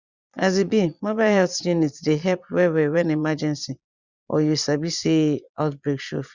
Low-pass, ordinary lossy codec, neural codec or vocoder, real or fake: 7.2 kHz; Opus, 64 kbps; vocoder, 22.05 kHz, 80 mel bands, Vocos; fake